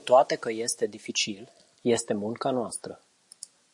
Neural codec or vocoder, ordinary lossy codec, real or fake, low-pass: none; MP3, 48 kbps; real; 10.8 kHz